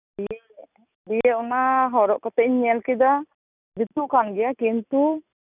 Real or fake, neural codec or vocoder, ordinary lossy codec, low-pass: real; none; none; 3.6 kHz